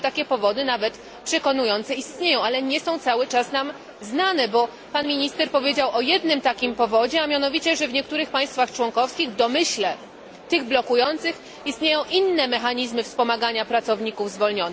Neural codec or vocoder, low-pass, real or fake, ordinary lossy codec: none; none; real; none